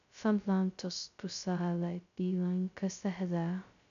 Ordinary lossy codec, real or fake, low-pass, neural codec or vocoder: none; fake; 7.2 kHz; codec, 16 kHz, 0.2 kbps, FocalCodec